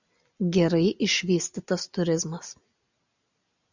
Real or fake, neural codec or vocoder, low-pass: real; none; 7.2 kHz